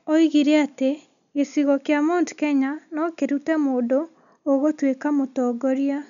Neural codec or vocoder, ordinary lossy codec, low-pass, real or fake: none; none; 7.2 kHz; real